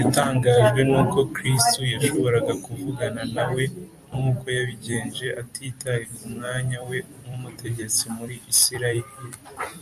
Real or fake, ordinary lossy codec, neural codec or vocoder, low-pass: fake; AAC, 96 kbps; vocoder, 44.1 kHz, 128 mel bands every 256 samples, BigVGAN v2; 14.4 kHz